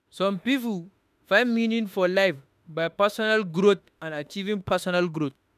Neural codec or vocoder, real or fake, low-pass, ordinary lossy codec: autoencoder, 48 kHz, 32 numbers a frame, DAC-VAE, trained on Japanese speech; fake; 14.4 kHz; none